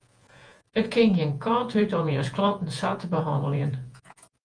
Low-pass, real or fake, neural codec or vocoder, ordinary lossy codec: 9.9 kHz; fake; vocoder, 48 kHz, 128 mel bands, Vocos; Opus, 32 kbps